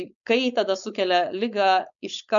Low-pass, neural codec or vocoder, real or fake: 7.2 kHz; codec, 16 kHz, 4.8 kbps, FACodec; fake